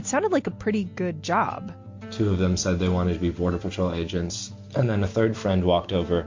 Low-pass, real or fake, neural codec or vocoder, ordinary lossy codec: 7.2 kHz; real; none; MP3, 48 kbps